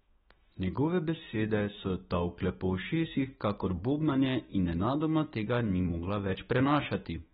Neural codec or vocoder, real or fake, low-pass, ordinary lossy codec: autoencoder, 48 kHz, 128 numbers a frame, DAC-VAE, trained on Japanese speech; fake; 19.8 kHz; AAC, 16 kbps